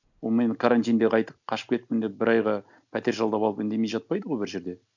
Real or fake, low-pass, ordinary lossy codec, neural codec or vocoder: real; 7.2 kHz; none; none